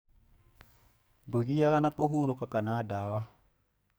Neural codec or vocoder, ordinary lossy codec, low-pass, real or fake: codec, 44.1 kHz, 2.6 kbps, SNAC; none; none; fake